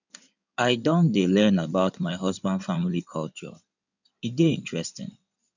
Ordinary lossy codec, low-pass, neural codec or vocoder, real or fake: none; 7.2 kHz; codec, 16 kHz in and 24 kHz out, 2.2 kbps, FireRedTTS-2 codec; fake